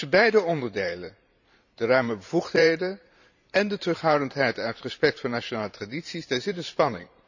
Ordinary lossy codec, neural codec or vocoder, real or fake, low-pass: none; vocoder, 44.1 kHz, 128 mel bands every 512 samples, BigVGAN v2; fake; 7.2 kHz